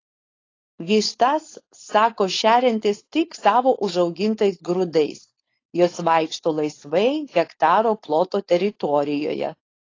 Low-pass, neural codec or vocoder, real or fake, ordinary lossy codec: 7.2 kHz; codec, 16 kHz, 4.8 kbps, FACodec; fake; AAC, 32 kbps